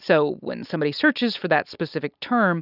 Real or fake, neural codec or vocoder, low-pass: real; none; 5.4 kHz